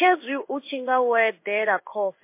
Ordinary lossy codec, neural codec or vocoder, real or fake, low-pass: MP3, 24 kbps; codec, 16 kHz in and 24 kHz out, 1 kbps, XY-Tokenizer; fake; 3.6 kHz